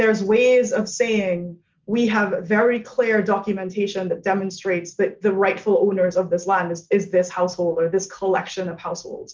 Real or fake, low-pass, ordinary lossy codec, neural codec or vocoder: real; 7.2 kHz; Opus, 32 kbps; none